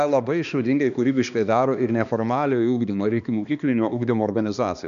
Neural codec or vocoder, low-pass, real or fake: codec, 16 kHz, 2 kbps, X-Codec, HuBERT features, trained on balanced general audio; 7.2 kHz; fake